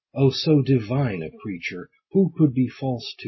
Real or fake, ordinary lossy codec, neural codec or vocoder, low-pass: real; MP3, 24 kbps; none; 7.2 kHz